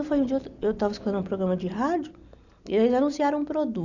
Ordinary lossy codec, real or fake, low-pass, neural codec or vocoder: none; real; 7.2 kHz; none